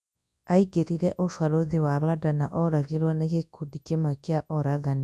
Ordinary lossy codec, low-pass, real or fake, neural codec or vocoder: none; none; fake; codec, 24 kHz, 0.9 kbps, WavTokenizer, large speech release